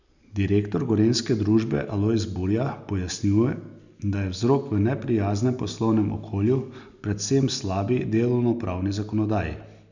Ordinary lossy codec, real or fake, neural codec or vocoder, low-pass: none; real; none; 7.2 kHz